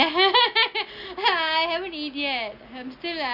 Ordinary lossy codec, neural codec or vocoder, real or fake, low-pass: none; none; real; 5.4 kHz